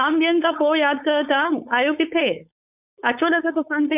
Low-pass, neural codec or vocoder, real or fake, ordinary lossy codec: 3.6 kHz; codec, 16 kHz, 4.8 kbps, FACodec; fake; none